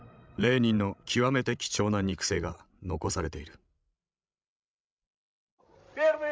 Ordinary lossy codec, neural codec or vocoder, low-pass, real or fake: none; codec, 16 kHz, 16 kbps, FreqCodec, larger model; none; fake